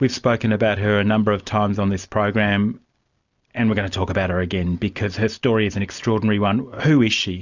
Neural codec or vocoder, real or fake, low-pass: none; real; 7.2 kHz